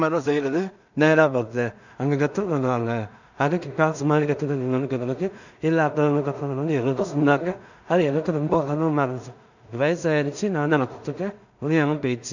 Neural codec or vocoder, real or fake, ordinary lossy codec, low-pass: codec, 16 kHz in and 24 kHz out, 0.4 kbps, LongCat-Audio-Codec, two codebook decoder; fake; none; 7.2 kHz